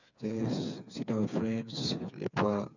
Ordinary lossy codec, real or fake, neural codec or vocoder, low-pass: none; fake; codec, 16 kHz, 4 kbps, FreqCodec, smaller model; 7.2 kHz